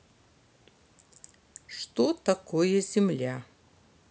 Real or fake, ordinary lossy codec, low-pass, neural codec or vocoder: real; none; none; none